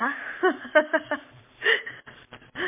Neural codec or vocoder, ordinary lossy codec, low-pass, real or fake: codec, 44.1 kHz, 7.8 kbps, Pupu-Codec; MP3, 24 kbps; 3.6 kHz; fake